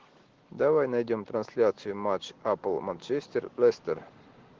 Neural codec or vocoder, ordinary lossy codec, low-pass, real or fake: none; Opus, 16 kbps; 7.2 kHz; real